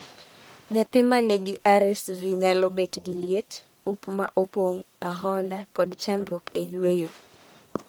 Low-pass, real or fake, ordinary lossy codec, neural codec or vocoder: none; fake; none; codec, 44.1 kHz, 1.7 kbps, Pupu-Codec